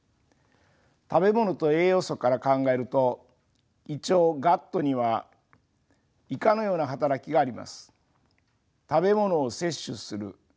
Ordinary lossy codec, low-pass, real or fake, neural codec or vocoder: none; none; real; none